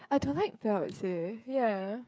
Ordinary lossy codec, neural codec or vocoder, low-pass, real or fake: none; codec, 16 kHz, 8 kbps, FreqCodec, smaller model; none; fake